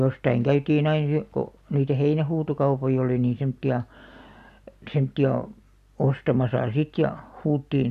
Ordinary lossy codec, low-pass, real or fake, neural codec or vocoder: none; 14.4 kHz; real; none